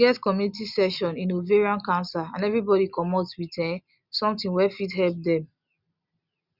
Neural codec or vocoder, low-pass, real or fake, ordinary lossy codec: none; 5.4 kHz; real; Opus, 64 kbps